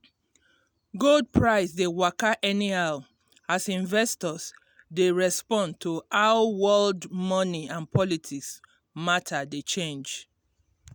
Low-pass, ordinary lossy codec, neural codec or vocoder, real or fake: none; none; none; real